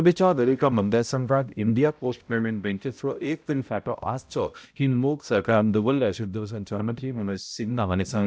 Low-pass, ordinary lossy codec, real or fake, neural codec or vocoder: none; none; fake; codec, 16 kHz, 0.5 kbps, X-Codec, HuBERT features, trained on balanced general audio